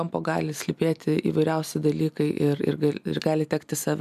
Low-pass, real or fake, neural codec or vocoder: 14.4 kHz; real; none